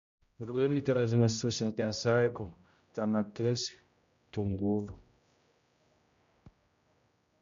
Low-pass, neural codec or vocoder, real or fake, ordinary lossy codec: 7.2 kHz; codec, 16 kHz, 0.5 kbps, X-Codec, HuBERT features, trained on general audio; fake; MP3, 64 kbps